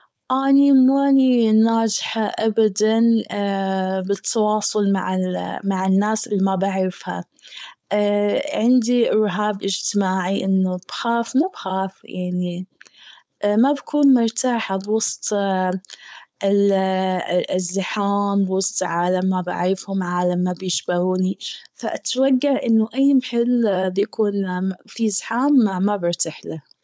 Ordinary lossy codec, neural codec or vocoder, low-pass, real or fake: none; codec, 16 kHz, 4.8 kbps, FACodec; none; fake